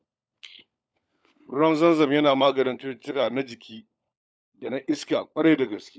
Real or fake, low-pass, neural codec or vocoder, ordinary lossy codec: fake; none; codec, 16 kHz, 16 kbps, FunCodec, trained on LibriTTS, 50 frames a second; none